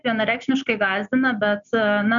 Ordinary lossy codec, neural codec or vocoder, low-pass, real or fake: MP3, 96 kbps; none; 7.2 kHz; real